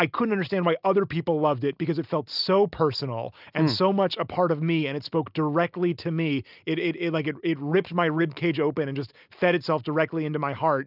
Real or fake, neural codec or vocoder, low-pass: real; none; 5.4 kHz